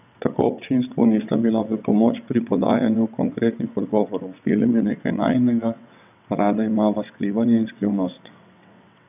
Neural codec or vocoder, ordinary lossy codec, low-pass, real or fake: vocoder, 22.05 kHz, 80 mel bands, WaveNeXt; none; 3.6 kHz; fake